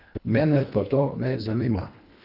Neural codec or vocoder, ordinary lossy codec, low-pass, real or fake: codec, 24 kHz, 1.5 kbps, HILCodec; Opus, 64 kbps; 5.4 kHz; fake